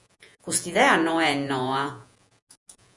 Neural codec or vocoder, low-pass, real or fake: vocoder, 48 kHz, 128 mel bands, Vocos; 10.8 kHz; fake